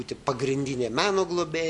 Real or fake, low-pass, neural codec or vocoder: real; 10.8 kHz; none